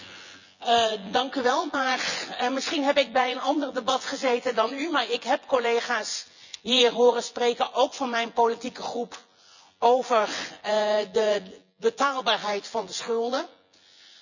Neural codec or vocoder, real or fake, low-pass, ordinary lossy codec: vocoder, 24 kHz, 100 mel bands, Vocos; fake; 7.2 kHz; none